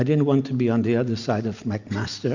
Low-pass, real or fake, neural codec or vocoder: 7.2 kHz; real; none